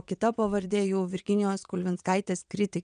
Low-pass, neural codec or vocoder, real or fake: 9.9 kHz; vocoder, 22.05 kHz, 80 mel bands, WaveNeXt; fake